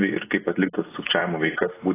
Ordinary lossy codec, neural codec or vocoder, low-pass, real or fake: AAC, 16 kbps; none; 3.6 kHz; real